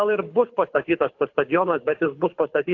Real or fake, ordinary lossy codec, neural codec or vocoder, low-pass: fake; AAC, 48 kbps; codec, 24 kHz, 6 kbps, HILCodec; 7.2 kHz